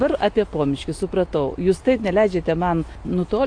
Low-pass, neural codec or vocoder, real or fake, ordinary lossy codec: 9.9 kHz; none; real; AAC, 48 kbps